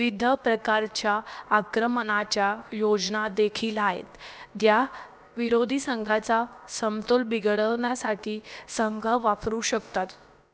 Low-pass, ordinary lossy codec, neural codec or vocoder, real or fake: none; none; codec, 16 kHz, about 1 kbps, DyCAST, with the encoder's durations; fake